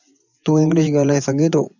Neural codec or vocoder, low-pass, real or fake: vocoder, 24 kHz, 100 mel bands, Vocos; 7.2 kHz; fake